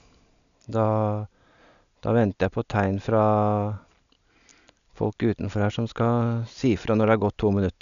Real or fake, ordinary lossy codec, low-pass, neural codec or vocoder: real; none; 7.2 kHz; none